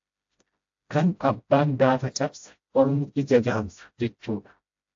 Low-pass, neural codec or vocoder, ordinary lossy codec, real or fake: 7.2 kHz; codec, 16 kHz, 0.5 kbps, FreqCodec, smaller model; AAC, 48 kbps; fake